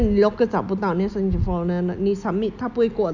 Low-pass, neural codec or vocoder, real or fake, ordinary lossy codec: 7.2 kHz; none; real; none